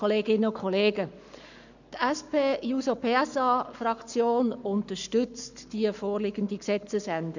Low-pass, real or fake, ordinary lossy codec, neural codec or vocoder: 7.2 kHz; fake; none; codec, 44.1 kHz, 7.8 kbps, Pupu-Codec